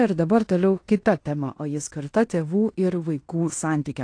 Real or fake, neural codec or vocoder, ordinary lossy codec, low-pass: fake; codec, 16 kHz in and 24 kHz out, 0.9 kbps, LongCat-Audio-Codec, fine tuned four codebook decoder; AAC, 48 kbps; 9.9 kHz